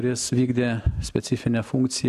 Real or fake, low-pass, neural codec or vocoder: real; 14.4 kHz; none